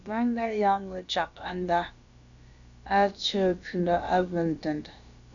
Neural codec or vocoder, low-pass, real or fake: codec, 16 kHz, about 1 kbps, DyCAST, with the encoder's durations; 7.2 kHz; fake